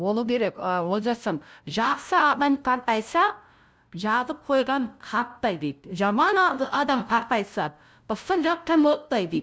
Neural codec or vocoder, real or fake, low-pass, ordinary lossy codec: codec, 16 kHz, 0.5 kbps, FunCodec, trained on LibriTTS, 25 frames a second; fake; none; none